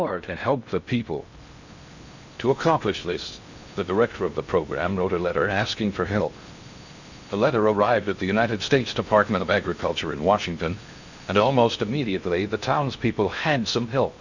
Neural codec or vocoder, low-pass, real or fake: codec, 16 kHz in and 24 kHz out, 0.6 kbps, FocalCodec, streaming, 2048 codes; 7.2 kHz; fake